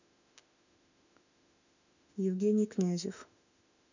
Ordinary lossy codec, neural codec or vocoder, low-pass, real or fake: none; autoencoder, 48 kHz, 32 numbers a frame, DAC-VAE, trained on Japanese speech; 7.2 kHz; fake